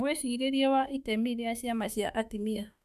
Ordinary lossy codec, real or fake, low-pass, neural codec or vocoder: none; fake; 14.4 kHz; autoencoder, 48 kHz, 32 numbers a frame, DAC-VAE, trained on Japanese speech